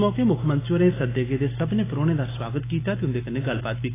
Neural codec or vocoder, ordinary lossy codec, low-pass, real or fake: none; AAC, 16 kbps; 3.6 kHz; real